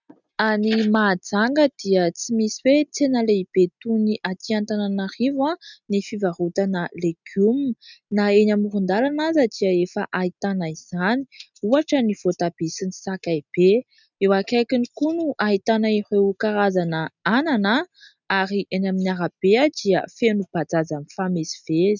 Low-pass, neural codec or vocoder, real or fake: 7.2 kHz; none; real